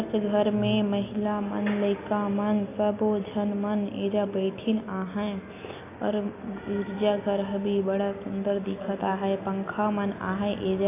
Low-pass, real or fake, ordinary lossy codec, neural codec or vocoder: 3.6 kHz; real; AAC, 24 kbps; none